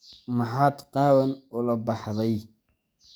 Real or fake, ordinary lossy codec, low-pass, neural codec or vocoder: fake; none; none; codec, 44.1 kHz, 7.8 kbps, DAC